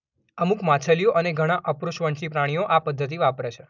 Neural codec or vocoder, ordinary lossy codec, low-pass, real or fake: none; none; 7.2 kHz; real